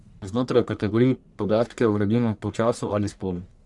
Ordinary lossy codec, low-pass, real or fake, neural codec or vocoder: Opus, 64 kbps; 10.8 kHz; fake; codec, 44.1 kHz, 1.7 kbps, Pupu-Codec